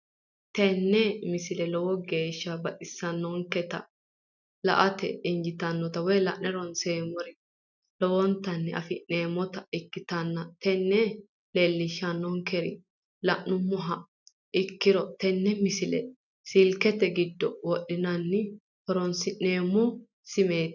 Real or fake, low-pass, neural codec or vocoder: real; 7.2 kHz; none